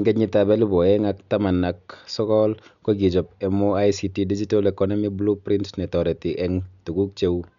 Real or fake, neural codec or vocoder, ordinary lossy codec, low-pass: real; none; none; 7.2 kHz